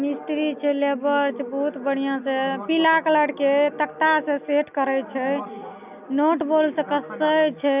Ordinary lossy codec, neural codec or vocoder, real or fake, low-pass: none; none; real; 3.6 kHz